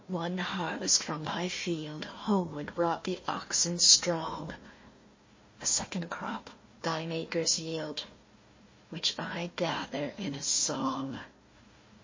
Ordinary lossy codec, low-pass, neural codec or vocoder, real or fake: MP3, 32 kbps; 7.2 kHz; codec, 16 kHz, 1 kbps, FunCodec, trained on Chinese and English, 50 frames a second; fake